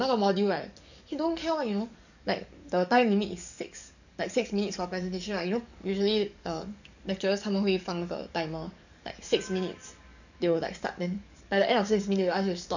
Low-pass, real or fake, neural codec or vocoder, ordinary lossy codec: 7.2 kHz; fake; codec, 44.1 kHz, 7.8 kbps, DAC; none